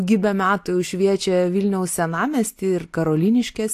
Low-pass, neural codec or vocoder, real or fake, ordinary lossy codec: 14.4 kHz; none; real; AAC, 64 kbps